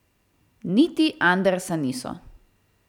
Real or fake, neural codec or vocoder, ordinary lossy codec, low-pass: fake; vocoder, 44.1 kHz, 128 mel bands every 256 samples, BigVGAN v2; none; 19.8 kHz